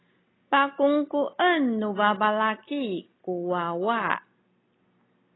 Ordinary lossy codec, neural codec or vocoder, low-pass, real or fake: AAC, 16 kbps; none; 7.2 kHz; real